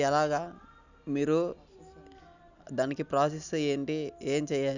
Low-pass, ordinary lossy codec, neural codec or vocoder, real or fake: 7.2 kHz; none; none; real